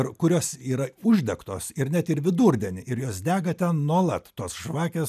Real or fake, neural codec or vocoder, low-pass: real; none; 14.4 kHz